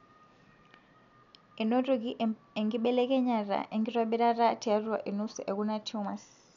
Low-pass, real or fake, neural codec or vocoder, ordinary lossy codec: 7.2 kHz; real; none; none